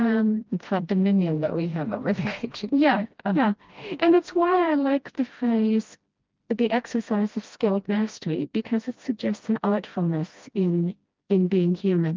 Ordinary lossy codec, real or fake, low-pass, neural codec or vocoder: Opus, 24 kbps; fake; 7.2 kHz; codec, 16 kHz, 1 kbps, FreqCodec, smaller model